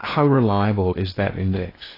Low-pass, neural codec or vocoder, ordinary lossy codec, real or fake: 5.4 kHz; codec, 16 kHz in and 24 kHz out, 0.8 kbps, FocalCodec, streaming, 65536 codes; AAC, 24 kbps; fake